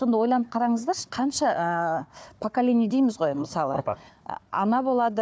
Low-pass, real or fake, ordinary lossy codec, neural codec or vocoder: none; fake; none; codec, 16 kHz, 4 kbps, FunCodec, trained on Chinese and English, 50 frames a second